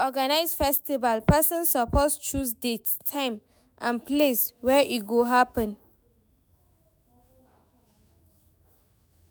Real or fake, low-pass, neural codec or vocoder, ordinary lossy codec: fake; none; autoencoder, 48 kHz, 128 numbers a frame, DAC-VAE, trained on Japanese speech; none